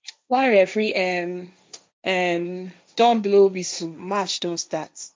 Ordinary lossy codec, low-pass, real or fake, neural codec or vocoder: none; none; fake; codec, 16 kHz, 1.1 kbps, Voila-Tokenizer